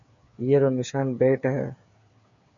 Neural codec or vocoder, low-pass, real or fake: codec, 16 kHz, 8 kbps, FreqCodec, smaller model; 7.2 kHz; fake